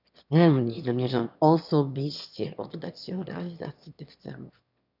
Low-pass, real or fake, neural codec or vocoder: 5.4 kHz; fake; autoencoder, 22.05 kHz, a latent of 192 numbers a frame, VITS, trained on one speaker